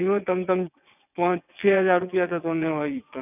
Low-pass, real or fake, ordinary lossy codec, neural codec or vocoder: 3.6 kHz; fake; none; vocoder, 22.05 kHz, 80 mel bands, WaveNeXt